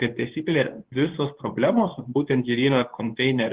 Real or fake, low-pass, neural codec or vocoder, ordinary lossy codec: fake; 3.6 kHz; codec, 24 kHz, 0.9 kbps, WavTokenizer, medium speech release version 2; Opus, 16 kbps